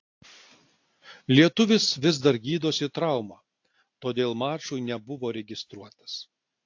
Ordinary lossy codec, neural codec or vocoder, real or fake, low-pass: AAC, 48 kbps; none; real; 7.2 kHz